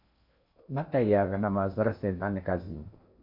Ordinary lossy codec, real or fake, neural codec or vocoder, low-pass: MP3, 48 kbps; fake; codec, 16 kHz in and 24 kHz out, 0.8 kbps, FocalCodec, streaming, 65536 codes; 5.4 kHz